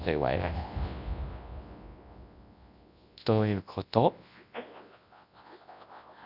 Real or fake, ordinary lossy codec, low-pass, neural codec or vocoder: fake; none; 5.4 kHz; codec, 24 kHz, 0.9 kbps, WavTokenizer, large speech release